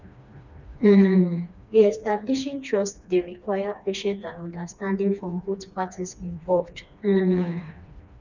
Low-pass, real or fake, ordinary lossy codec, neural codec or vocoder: 7.2 kHz; fake; none; codec, 16 kHz, 2 kbps, FreqCodec, smaller model